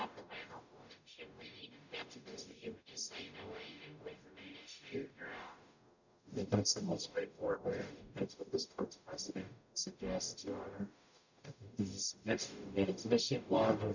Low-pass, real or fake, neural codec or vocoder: 7.2 kHz; fake; codec, 44.1 kHz, 0.9 kbps, DAC